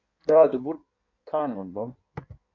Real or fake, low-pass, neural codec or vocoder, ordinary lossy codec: fake; 7.2 kHz; codec, 16 kHz in and 24 kHz out, 1.1 kbps, FireRedTTS-2 codec; MP3, 48 kbps